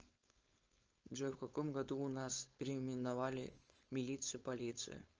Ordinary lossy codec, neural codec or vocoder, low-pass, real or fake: Opus, 24 kbps; codec, 16 kHz, 4.8 kbps, FACodec; 7.2 kHz; fake